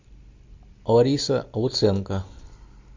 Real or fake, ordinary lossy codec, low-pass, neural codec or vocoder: real; MP3, 48 kbps; 7.2 kHz; none